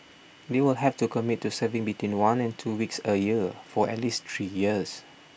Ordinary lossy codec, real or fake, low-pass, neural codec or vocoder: none; real; none; none